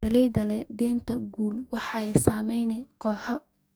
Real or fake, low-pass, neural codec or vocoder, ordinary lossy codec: fake; none; codec, 44.1 kHz, 2.6 kbps, DAC; none